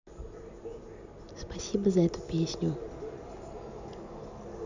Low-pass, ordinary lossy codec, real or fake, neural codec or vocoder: 7.2 kHz; none; real; none